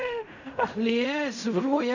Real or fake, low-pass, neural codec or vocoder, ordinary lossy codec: fake; 7.2 kHz; codec, 16 kHz in and 24 kHz out, 0.4 kbps, LongCat-Audio-Codec, fine tuned four codebook decoder; none